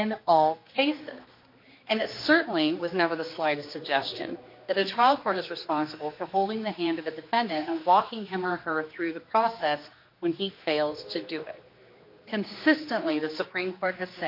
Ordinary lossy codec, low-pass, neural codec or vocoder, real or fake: MP3, 32 kbps; 5.4 kHz; codec, 16 kHz, 2 kbps, X-Codec, HuBERT features, trained on general audio; fake